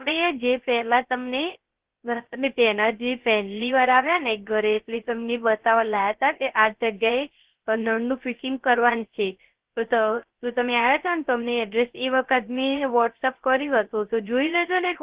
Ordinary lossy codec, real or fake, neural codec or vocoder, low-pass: Opus, 16 kbps; fake; codec, 16 kHz, 0.3 kbps, FocalCodec; 3.6 kHz